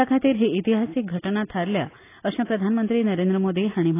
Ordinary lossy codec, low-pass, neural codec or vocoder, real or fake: AAC, 24 kbps; 3.6 kHz; none; real